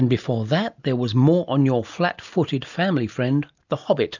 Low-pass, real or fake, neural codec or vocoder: 7.2 kHz; real; none